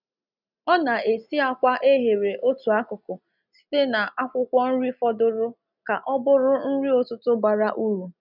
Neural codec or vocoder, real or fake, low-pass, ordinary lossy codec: none; real; 5.4 kHz; none